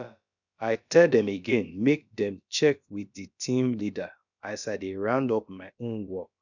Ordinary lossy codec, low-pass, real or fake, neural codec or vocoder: none; 7.2 kHz; fake; codec, 16 kHz, about 1 kbps, DyCAST, with the encoder's durations